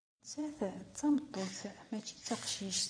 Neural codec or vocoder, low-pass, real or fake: vocoder, 22.05 kHz, 80 mel bands, WaveNeXt; 9.9 kHz; fake